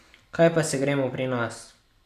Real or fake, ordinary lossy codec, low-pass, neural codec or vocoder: real; none; 14.4 kHz; none